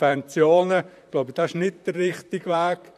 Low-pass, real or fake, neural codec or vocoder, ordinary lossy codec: 14.4 kHz; fake; vocoder, 44.1 kHz, 128 mel bands, Pupu-Vocoder; none